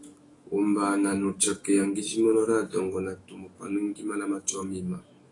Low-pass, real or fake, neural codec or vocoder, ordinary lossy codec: 10.8 kHz; fake; autoencoder, 48 kHz, 128 numbers a frame, DAC-VAE, trained on Japanese speech; AAC, 32 kbps